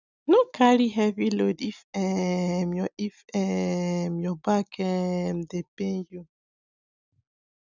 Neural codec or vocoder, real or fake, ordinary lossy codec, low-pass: none; real; none; 7.2 kHz